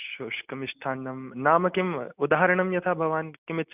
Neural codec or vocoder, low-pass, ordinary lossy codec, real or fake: none; 3.6 kHz; none; real